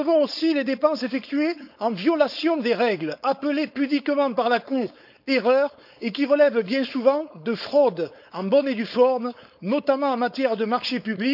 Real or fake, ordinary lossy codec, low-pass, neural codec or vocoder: fake; none; 5.4 kHz; codec, 16 kHz, 4.8 kbps, FACodec